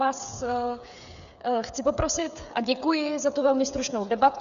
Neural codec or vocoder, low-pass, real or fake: codec, 16 kHz, 8 kbps, FreqCodec, smaller model; 7.2 kHz; fake